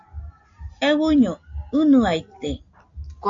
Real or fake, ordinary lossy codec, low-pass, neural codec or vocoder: real; AAC, 48 kbps; 7.2 kHz; none